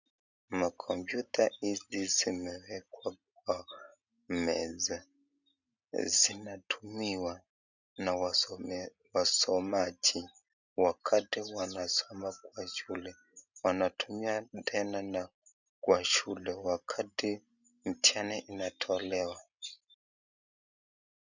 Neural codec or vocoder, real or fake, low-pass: none; real; 7.2 kHz